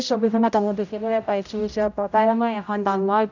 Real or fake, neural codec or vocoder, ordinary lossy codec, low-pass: fake; codec, 16 kHz, 0.5 kbps, X-Codec, HuBERT features, trained on general audio; none; 7.2 kHz